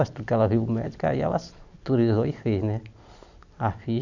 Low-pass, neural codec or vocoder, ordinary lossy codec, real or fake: 7.2 kHz; none; none; real